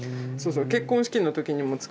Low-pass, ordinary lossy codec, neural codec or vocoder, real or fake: none; none; none; real